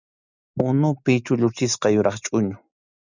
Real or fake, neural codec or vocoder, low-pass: real; none; 7.2 kHz